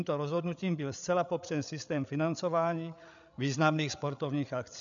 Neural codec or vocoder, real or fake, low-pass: codec, 16 kHz, 8 kbps, FreqCodec, larger model; fake; 7.2 kHz